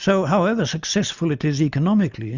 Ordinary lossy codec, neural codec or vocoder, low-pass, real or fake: Opus, 64 kbps; none; 7.2 kHz; real